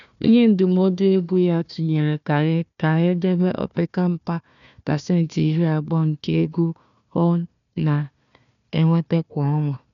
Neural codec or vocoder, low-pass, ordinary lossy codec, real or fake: codec, 16 kHz, 1 kbps, FunCodec, trained on Chinese and English, 50 frames a second; 7.2 kHz; none; fake